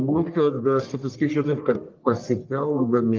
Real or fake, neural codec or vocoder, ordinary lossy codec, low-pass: fake; codec, 44.1 kHz, 1.7 kbps, Pupu-Codec; Opus, 32 kbps; 7.2 kHz